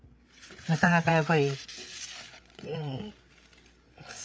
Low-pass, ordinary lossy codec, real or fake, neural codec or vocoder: none; none; fake; codec, 16 kHz, 8 kbps, FreqCodec, larger model